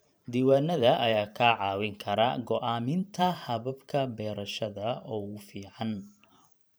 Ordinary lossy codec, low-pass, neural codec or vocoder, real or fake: none; none; vocoder, 44.1 kHz, 128 mel bands every 512 samples, BigVGAN v2; fake